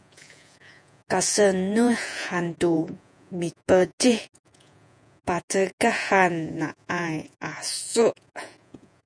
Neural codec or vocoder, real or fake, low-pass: vocoder, 48 kHz, 128 mel bands, Vocos; fake; 9.9 kHz